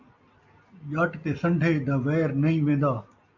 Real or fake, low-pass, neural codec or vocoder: real; 7.2 kHz; none